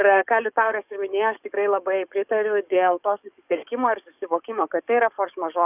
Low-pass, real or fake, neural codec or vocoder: 3.6 kHz; fake; codec, 44.1 kHz, 7.8 kbps, DAC